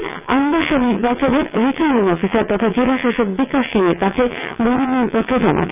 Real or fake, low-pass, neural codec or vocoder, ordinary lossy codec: fake; 3.6 kHz; vocoder, 22.05 kHz, 80 mel bands, WaveNeXt; none